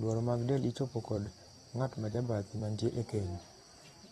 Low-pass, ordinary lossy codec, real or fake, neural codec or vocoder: 19.8 kHz; AAC, 32 kbps; fake; vocoder, 44.1 kHz, 128 mel bands every 256 samples, BigVGAN v2